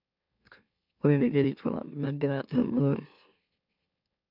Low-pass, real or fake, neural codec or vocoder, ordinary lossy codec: 5.4 kHz; fake; autoencoder, 44.1 kHz, a latent of 192 numbers a frame, MeloTTS; none